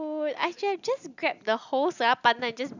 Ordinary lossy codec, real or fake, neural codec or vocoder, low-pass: none; real; none; 7.2 kHz